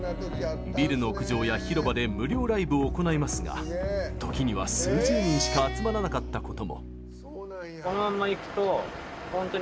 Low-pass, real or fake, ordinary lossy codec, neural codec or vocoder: none; real; none; none